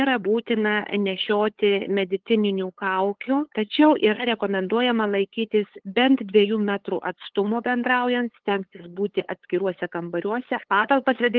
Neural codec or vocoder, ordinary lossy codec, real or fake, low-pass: codec, 16 kHz, 8 kbps, FunCodec, trained on LibriTTS, 25 frames a second; Opus, 16 kbps; fake; 7.2 kHz